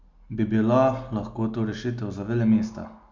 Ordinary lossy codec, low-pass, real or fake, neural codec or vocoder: MP3, 64 kbps; 7.2 kHz; real; none